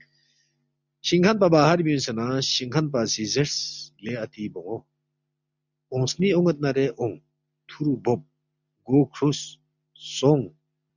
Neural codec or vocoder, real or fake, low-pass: none; real; 7.2 kHz